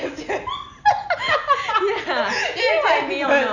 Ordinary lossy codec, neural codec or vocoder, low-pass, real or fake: none; none; 7.2 kHz; real